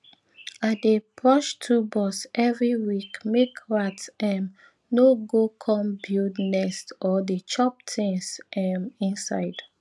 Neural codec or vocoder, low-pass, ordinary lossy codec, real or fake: none; none; none; real